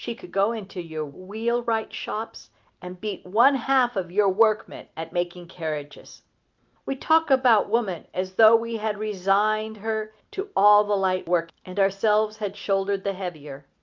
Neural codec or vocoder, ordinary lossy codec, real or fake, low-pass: none; Opus, 24 kbps; real; 7.2 kHz